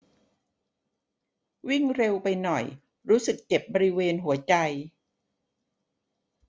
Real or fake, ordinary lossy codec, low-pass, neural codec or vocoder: real; none; none; none